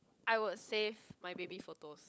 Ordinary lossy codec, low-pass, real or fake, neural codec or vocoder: none; none; fake; codec, 16 kHz, 16 kbps, FunCodec, trained on LibriTTS, 50 frames a second